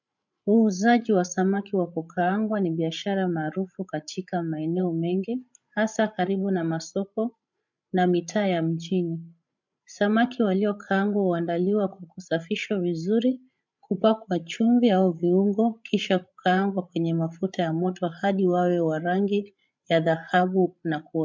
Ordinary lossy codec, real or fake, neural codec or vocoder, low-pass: MP3, 64 kbps; fake; codec, 16 kHz, 16 kbps, FreqCodec, larger model; 7.2 kHz